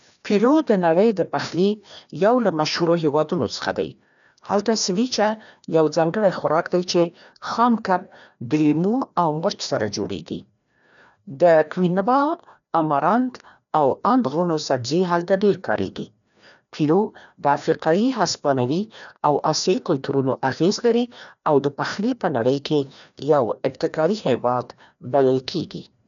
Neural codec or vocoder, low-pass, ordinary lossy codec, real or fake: codec, 16 kHz, 1 kbps, FreqCodec, larger model; 7.2 kHz; none; fake